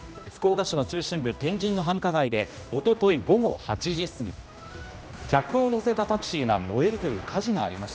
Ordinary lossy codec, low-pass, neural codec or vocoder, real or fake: none; none; codec, 16 kHz, 1 kbps, X-Codec, HuBERT features, trained on general audio; fake